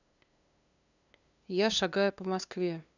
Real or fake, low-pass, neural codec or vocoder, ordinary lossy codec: fake; 7.2 kHz; codec, 16 kHz, 2 kbps, FunCodec, trained on LibriTTS, 25 frames a second; none